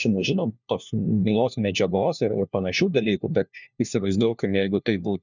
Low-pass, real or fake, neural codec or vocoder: 7.2 kHz; fake; codec, 16 kHz, 1 kbps, FunCodec, trained on LibriTTS, 50 frames a second